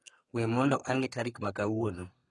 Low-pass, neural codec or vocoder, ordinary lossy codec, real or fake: 10.8 kHz; codec, 32 kHz, 1.9 kbps, SNAC; AAC, 32 kbps; fake